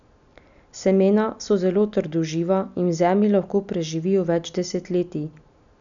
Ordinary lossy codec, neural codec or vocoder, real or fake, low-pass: none; none; real; 7.2 kHz